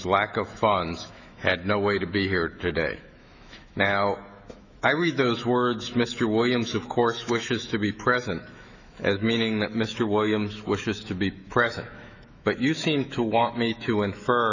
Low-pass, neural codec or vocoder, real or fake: 7.2 kHz; autoencoder, 48 kHz, 128 numbers a frame, DAC-VAE, trained on Japanese speech; fake